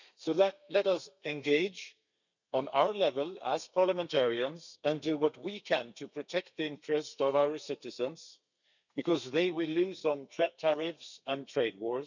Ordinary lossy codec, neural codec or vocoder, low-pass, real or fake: none; codec, 32 kHz, 1.9 kbps, SNAC; 7.2 kHz; fake